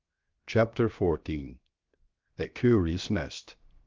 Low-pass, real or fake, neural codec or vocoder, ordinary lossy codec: 7.2 kHz; fake; codec, 16 kHz, 0.7 kbps, FocalCodec; Opus, 24 kbps